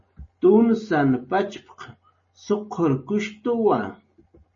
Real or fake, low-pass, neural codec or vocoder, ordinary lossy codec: real; 7.2 kHz; none; MP3, 32 kbps